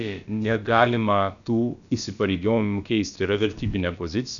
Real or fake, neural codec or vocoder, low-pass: fake; codec, 16 kHz, about 1 kbps, DyCAST, with the encoder's durations; 7.2 kHz